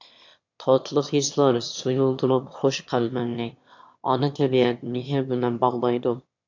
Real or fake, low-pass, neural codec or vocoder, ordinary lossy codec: fake; 7.2 kHz; autoencoder, 22.05 kHz, a latent of 192 numbers a frame, VITS, trained on one speaker; AAC, 48 kbps